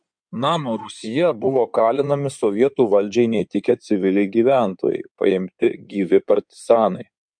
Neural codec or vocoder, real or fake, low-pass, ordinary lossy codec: codec, 16 kHz in and 24 kHz out, 2.2 kbps, FireRedTTS-2 codec; fake; 9.9 kHz; MP3, 64 kbps